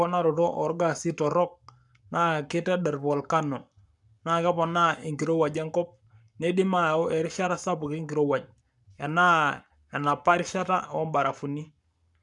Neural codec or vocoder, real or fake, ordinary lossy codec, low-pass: codec, 44.1 kHz, 7.8 kbps, Pupu-Codec; fake; none; 10.8 kHz